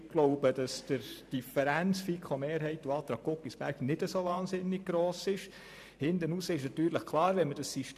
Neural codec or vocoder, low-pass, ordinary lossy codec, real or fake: vocoder, 44.1 kHz, 128 mel bands every 512 samples, BigVGAN v2; 14.4 kHz; MP3, 96 kbps; fake